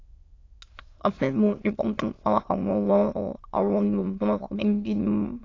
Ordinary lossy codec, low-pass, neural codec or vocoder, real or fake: AAC, 32 kbps; 7.2 kHz; autoencoder, 22.05 kHz, a latent of 192 numbers a frame, VITS, trained on many speakers; fake